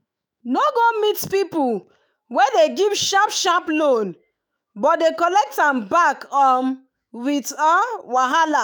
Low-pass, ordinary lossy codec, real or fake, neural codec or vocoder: none; none; fake; autoencoder, 48 kHz, 128 numbers a frame, DAC-VAE, trained on Japanese speech